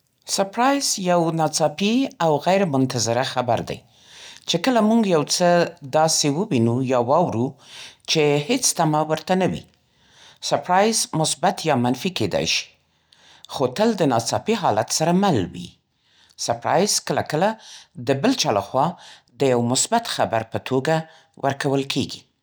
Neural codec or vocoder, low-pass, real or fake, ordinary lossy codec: none; none; real; none